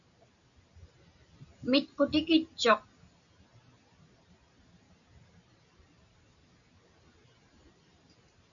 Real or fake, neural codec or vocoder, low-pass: real; none; 7.2 kHz